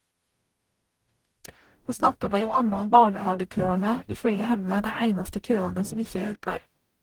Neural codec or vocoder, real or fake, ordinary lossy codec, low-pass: codec, 44.1 kHz, 0.9 kbps, DAC; fake; Opus, 24 kbps; 19.8 kHz